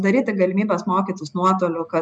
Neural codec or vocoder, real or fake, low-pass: none; real; 9.9 kHz